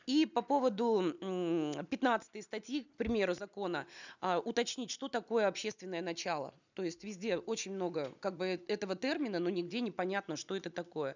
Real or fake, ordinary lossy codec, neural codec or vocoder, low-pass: real; none; none; 7.2 kHz